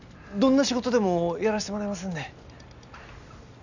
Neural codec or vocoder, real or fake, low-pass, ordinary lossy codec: none; real; 7.2 kHz; none